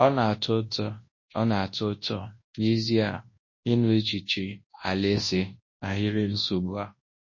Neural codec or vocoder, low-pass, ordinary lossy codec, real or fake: codec, 24 kHz, 0.9 kbps, WavTokenizer, large speech release; 7.2 kHz; MP3, 32 kbps; fake